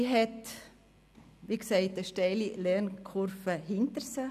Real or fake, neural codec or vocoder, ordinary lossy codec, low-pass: real; none; none; 14.4 kHz